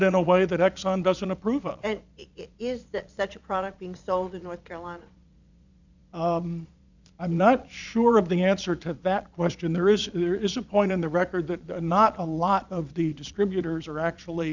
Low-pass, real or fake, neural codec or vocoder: 7.2 kHz; fake; vocoder, 22.05 kHz, 80 mel bands, Vocos